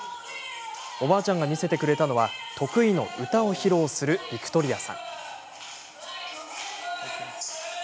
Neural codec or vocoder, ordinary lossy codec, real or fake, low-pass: none; none; real; none